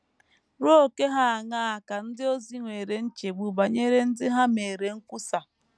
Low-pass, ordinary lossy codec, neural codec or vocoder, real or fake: none; none; none; real